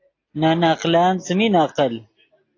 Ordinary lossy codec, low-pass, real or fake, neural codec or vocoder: AAC, 32 kbps; 7.2 kHz; real; none